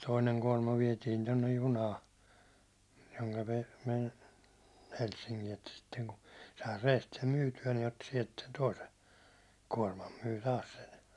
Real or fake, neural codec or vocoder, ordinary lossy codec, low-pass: real; none; none; none